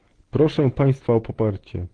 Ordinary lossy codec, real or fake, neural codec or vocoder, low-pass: Opus, 16 kbps; real; none; 9.9 kHz